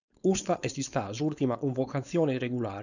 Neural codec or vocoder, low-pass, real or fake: codec, 16 kHz, 4.8 kbps, FACodec; 7.2 kHz; fake